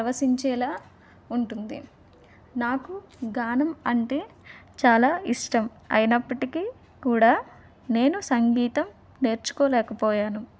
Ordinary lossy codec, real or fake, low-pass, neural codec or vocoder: none; real; none; none